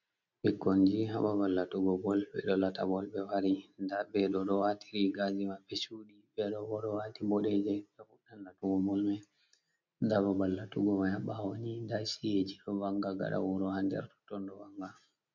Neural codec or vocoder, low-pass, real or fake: none; 7.2 kHz; real